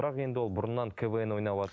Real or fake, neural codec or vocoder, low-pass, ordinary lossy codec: real; none; none; none